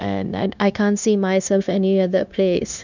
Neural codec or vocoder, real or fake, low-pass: codec, 16 kHz, 0.9 kbps, LongCat-Audio-Codec; fake; 7.2 kHz